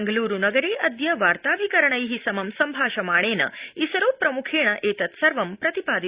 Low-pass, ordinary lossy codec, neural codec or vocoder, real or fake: 3.6 kHz; Opus, 64 kbps; none; real